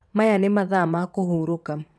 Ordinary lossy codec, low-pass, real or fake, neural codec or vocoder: none; none; fake; vocoder, 22.05 kHz, 80 mel bands, WaveNeXt